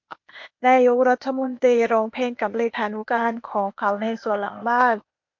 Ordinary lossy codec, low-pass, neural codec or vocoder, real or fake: MP3, 48 kbps; 7.2 kHz; codec, 16 kHz, 0.8 kbps, ZipCodec; fake